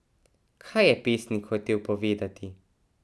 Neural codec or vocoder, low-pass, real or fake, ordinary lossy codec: none; none; real; none